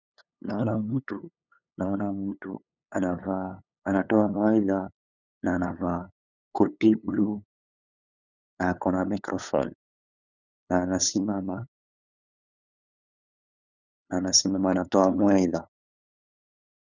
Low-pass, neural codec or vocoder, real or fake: 7.2 kHz; codec, 16 kHz, 8 kbps, FunCodec, trained on LibriTTS, 25 frames a second; fake